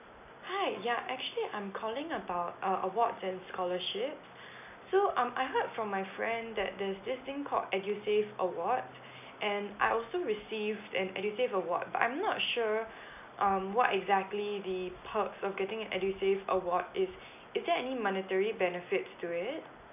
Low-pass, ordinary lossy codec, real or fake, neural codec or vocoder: 3.6 kHz; none; real; none